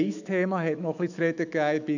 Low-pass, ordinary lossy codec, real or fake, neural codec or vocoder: 7.2 kHz; none; fake; autoencoder, 48 kHz, 128 numbers a frame, DAC-VAE, trained on Japanese speech